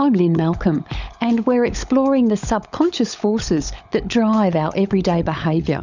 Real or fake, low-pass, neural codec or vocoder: fake; 7.2 kHz; codec, 16 kHz, 16 kbps, FunCodec, trained on LibriTTS, 50 frames a second